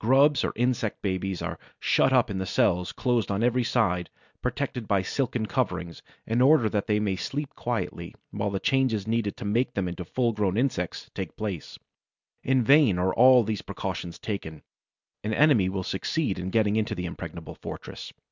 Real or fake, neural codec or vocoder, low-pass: real; none; 7.2 kHz